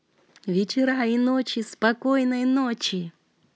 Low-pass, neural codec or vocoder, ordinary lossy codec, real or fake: none; none; none; real